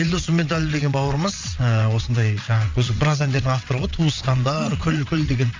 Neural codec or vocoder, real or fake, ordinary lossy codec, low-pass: vocoder, 22.05 kHz, 80 mel bands, WaveNeXt; fake; MP3, 64 kbps; 7.2 kHz